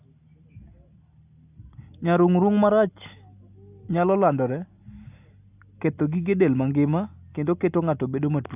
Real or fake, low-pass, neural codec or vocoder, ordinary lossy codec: real; 3.6 kHz; none; AAC, 32 kbps